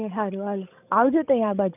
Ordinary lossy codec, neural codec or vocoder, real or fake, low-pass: none; codec, 16 kHz, 16 kbps, FreqCodec, larger model; fake; 3.6 kHz